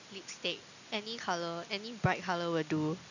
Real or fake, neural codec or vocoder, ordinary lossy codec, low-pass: real; none; none; 7.2 kHz